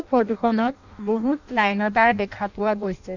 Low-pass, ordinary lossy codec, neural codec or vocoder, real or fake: 7.2 kHz; none; codec, 16 kHz in and 24 kHz out, 0.6 kbps, FireRedTTS-2 codec; fake